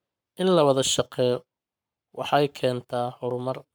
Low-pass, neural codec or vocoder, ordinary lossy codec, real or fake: none; codec, 44.1 kHz, 7.8 kbps, Pupu-Codec; none; fake